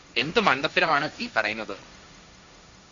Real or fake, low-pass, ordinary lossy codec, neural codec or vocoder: fake; 7.2 kHz; Opus, 64 kbps; codec, 16 kHz, 1.1 kbps, Voila-Tokenizer